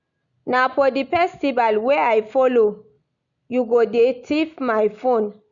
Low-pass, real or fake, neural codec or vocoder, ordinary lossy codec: 7.2 kHz; real; none; none